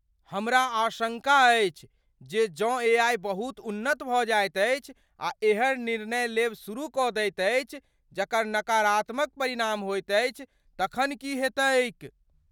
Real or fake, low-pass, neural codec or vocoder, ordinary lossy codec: real; 19.8 kHz; none; none